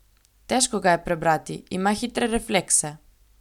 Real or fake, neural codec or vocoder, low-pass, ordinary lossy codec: real; none; 19.8 kHz; none